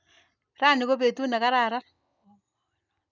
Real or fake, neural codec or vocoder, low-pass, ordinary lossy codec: real; none; 7.2 kHz; none